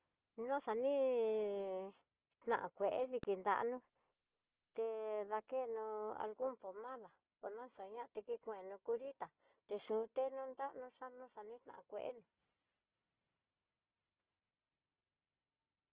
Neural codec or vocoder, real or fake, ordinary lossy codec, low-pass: none; real; Opus, 16 kbps; 3.6 kHz